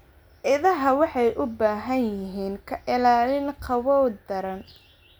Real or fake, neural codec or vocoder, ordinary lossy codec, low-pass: real; none; none; none